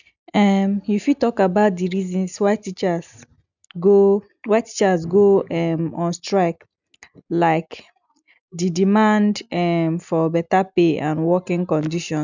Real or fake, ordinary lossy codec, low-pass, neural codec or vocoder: real; none; 7.2 kHz; none